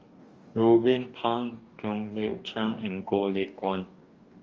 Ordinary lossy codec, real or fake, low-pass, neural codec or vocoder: Opus, 32 kbps; fake; 7.2 kHz; codec, 44.1 kHz, 2.6 kbps, DAC